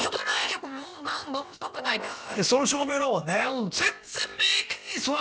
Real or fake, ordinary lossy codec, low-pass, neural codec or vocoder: fake; none; none; codec, 16 kHz, about 1 kbps, DyCAST, with the encoder's durations